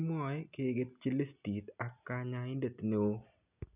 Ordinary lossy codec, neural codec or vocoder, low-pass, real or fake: none; none; 3.6 kHz; real